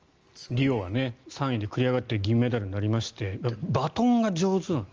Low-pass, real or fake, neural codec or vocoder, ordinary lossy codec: 7.2 kHz; real; none; Opus, 24 kbps